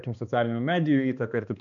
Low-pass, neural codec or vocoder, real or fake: 7.2 kHz; codec, 16 kHz, 2 kbps, X-Codec, HuBERT features, trained on balanced general audio; fake